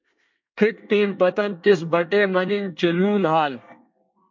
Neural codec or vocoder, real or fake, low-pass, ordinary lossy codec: codec, 24 kHz, 1 kbps, SNAC; fake; 7.2 kHz; MP3, 48 kbps